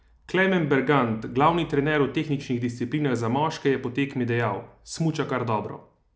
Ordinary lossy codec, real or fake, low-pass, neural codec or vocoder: none; real; none; none